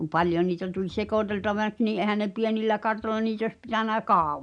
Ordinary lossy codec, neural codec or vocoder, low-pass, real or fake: none; none; 9.9 kHz; real